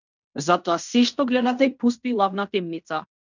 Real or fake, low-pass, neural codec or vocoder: fake; 7.2 kHz; codec, 16 kHz in and 24 kHz out, 0.9 kbps, LongCat-Audio-Codec, fine tuned four codebook decoder